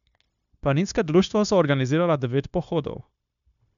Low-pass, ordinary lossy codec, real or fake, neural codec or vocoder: 7.2 kHz; none; fake; codec, 16 kHz, 0.9 kbps, LongCat-Audio-Codec